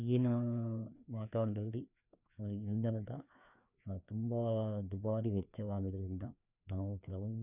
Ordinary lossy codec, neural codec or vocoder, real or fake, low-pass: none; codec, 16 kHz, 2 kbps, FreqCodec, larger model; fake; 3.6 kHz